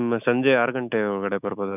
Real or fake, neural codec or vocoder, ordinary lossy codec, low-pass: fake; autoencoder, 48 kHz, 128 numbers a frame, DAC-VAE, trained on Japanese speech; none; 3.6 kHz